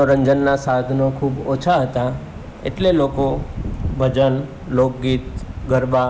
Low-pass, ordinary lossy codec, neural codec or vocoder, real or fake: none; none; none; real